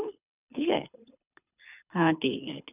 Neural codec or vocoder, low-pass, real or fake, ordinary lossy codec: codec, 24 kHz, 6 kbps, HILCodec; 3.6 kHz; fake; none